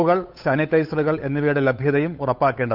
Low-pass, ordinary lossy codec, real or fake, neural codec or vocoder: 5.4 kHz; none; fake; codec, 16 kHz, 8 kbps, FunCodec, trained on Chinese and English, 25 frames a second